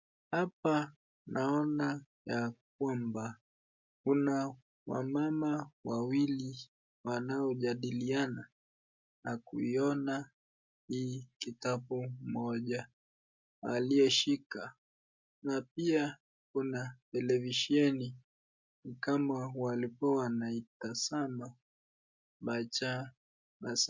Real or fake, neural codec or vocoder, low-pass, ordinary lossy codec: real; none; 7.2 kHz; MP3, 64 kbps